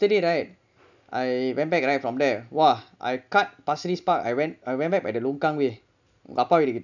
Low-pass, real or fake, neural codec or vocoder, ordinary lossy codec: 7.2 kHz; real; none; none